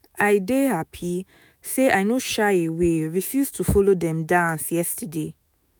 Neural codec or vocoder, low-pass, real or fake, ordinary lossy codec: autoencoder, 48 kHz, 128 numbers a frame, DAC-VAE, trained on Japanese speech; none; fake; none